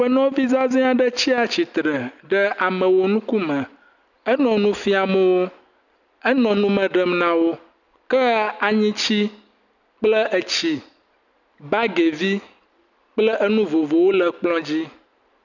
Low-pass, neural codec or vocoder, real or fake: 7.2 kHz; none; real